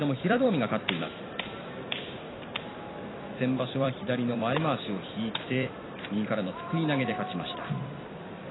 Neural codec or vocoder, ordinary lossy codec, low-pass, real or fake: vocoder, 44.1 kHz, 128 mel bands every 256 samples, BigVGAN v2; AAC, 16 kbps; 7.2 kHz; fake